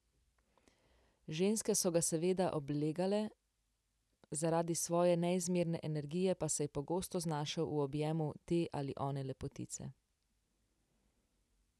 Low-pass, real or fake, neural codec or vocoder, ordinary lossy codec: none; real; none; none